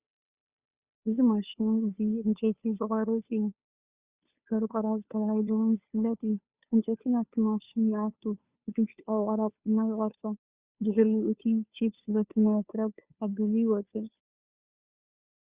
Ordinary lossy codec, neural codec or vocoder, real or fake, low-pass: Opus, 64 kbps; codec, 16 kHz, 2 kbps, FunCodec, trained on Chinese and English, 25 frames a second; fake; 3.6 kHz